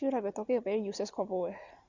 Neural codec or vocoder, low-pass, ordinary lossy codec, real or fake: none; 7.2 kHz; Opus, 64 kbps; real